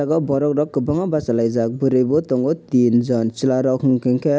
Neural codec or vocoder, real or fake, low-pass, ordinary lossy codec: none; real; none; none